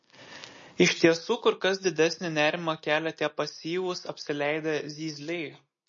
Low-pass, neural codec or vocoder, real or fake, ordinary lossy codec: 7.2 kHz; none; real; MP3, 32 kbps